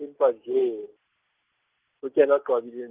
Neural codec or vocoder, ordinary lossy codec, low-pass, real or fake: none; Opus, 32 kbps; 3.6 kHz; real